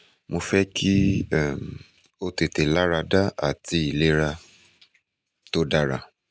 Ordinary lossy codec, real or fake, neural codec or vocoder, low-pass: none; real; none; none